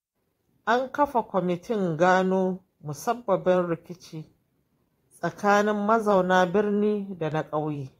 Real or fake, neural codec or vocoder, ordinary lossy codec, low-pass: fake; vocoder, 44.1 kHz, 128 mel bands every 512 samples, BigVGAN v2; AAC, 48 kbps; 19.8 kHz